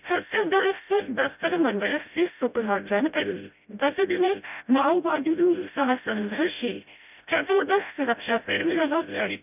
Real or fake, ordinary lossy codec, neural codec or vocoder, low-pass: fake; none; codec, 16 kHz, 0.5 kbps, FreqCodec, smaller model; 3.6 kHz